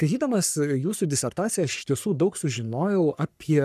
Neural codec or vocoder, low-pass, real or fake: codec, 44.1 kHz, 3.4 kbps, Pupu-Codec; 14.4 kHz; fake